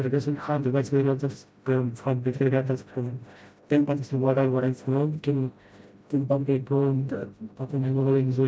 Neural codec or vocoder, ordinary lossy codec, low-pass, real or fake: codec, 16 kHz, 0.5 kbps, FreqCodec, smaller model; none; none; fake